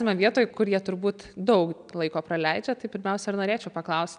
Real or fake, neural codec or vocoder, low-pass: real; none; 9.9 kHz